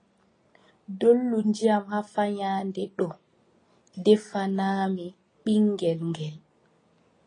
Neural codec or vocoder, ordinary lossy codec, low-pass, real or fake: none; AAC, 32 kbps; 9.9 kHz; real